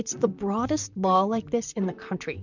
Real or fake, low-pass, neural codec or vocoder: fake; 7.2 kHz; vocoder, 44.1 kHz, 128 mel bands, Pupu-Vocoder